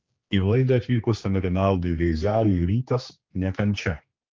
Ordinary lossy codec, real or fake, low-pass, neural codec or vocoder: Opus, 24 kbps; fake; 7.2 kHz; codec, 16 kHz, 2 kbps, X-Codec, HuBERT features, trained on general audio